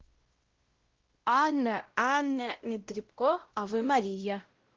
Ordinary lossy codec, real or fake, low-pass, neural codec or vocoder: Opus, 16 kbps; fake; 7.2 kHz; codec, 16 kHz, 0.5 kbps, X-Codec, WavLM features, trained on Multilingual LibriSpeech